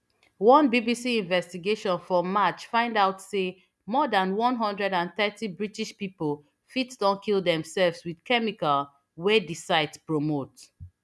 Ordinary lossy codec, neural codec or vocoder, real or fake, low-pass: none; none; real; none